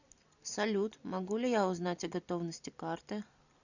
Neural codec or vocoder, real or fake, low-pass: vocoder, 44.1 kHz, 128 mel bands every 256 samples, BigVGAN v2; fake; 7.2 kHz